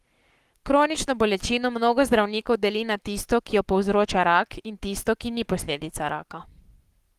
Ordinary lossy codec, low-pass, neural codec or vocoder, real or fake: Opus, 24 kbps; 14.4 kHz; codec, 44.1 kHz, 7.8 kbps, Pupu-Codec; fake